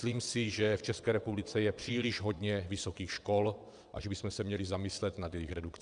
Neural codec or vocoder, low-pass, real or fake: vocoder, 22.05 kHz, 80 mel bands, WaveNeXt; 9.9 kHz; fake